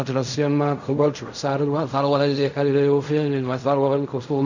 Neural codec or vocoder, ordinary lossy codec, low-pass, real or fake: codec, 16 kHz in and 24 kHz out, 0.4 kbps, LongCat-Audio-Codec, fine tuned four codebook decoder; none; 7.2 kHz; fake